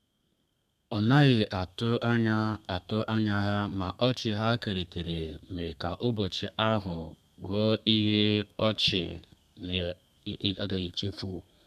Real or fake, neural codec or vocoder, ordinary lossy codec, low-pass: fake; codec, 32 kHz, 1.9 kbps, SNAC; none; 14.4 kHz